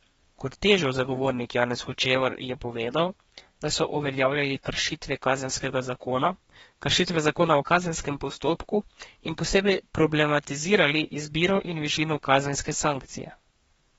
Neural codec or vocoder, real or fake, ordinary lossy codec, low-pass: codec, 32 kHz, 1.9 kbps, SNAC; fake; AAC, 24 kbps; 14.4 kHz